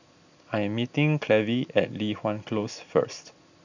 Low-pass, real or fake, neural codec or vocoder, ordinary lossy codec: 7.2 kHz; real; none; none